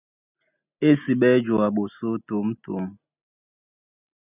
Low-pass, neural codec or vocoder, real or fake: 3.6 kHz; none; real